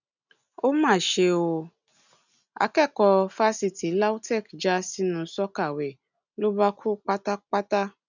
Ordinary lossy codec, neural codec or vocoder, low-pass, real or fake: none; none; 7.2 kHz; real